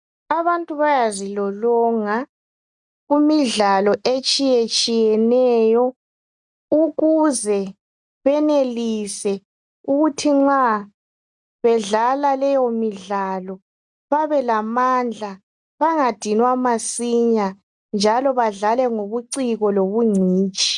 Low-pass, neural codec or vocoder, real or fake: 10.8 kHz; none; real